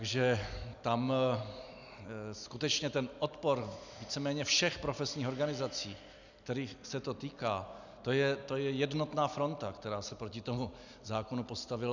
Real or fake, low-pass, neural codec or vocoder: real; 7.2 kHz; none